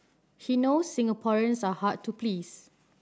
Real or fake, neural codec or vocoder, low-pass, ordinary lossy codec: real; none; none; none